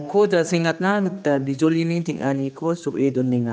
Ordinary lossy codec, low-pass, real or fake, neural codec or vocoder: none; none; fake; codec, 16 kHz, 2 kbps, X-Codec, HuBERT features, trained on general audio